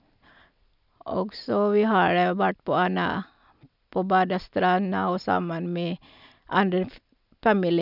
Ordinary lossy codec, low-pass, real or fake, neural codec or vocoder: none; 5.4 kHz; real; none